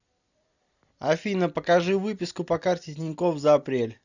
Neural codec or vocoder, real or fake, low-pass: none; real; 7.2 kHz